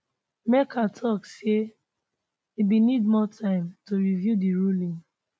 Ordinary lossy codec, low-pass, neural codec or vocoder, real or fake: none; none; none; real